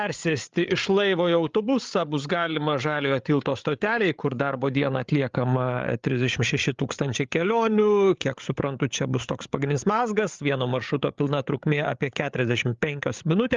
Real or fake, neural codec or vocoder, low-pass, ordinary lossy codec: fake; codec, 16 kHz, 8 kbps, FreqCodec, larger model; 7.2 kHz; Opus, 32 kbps